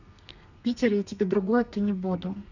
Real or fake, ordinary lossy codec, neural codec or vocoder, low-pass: fake; none; codec, 32 kHz, 1.9 kbps, SNAC; 7.2 kHz